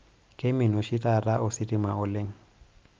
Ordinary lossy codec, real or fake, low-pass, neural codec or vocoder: Opus, 24 kbps; real; 7.2 kHz; none